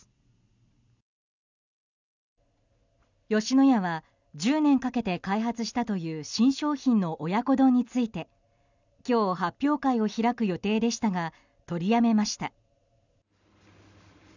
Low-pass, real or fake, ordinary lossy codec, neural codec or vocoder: 7.2 kHz; real; none; none